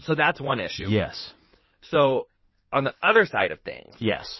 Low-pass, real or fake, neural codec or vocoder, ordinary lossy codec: 7.2 kHz; fake; codec, 16 kHz in and 24 kHz out, 2.2 kbps, FireRedTTS-2 codec; MP3, 24 kbps